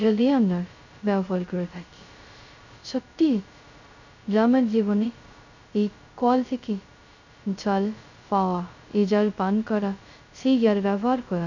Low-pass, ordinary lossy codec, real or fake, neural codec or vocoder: 7.2 kHz; none; fake; codec, 16 kHz, 0.2 kbps, FocalCodec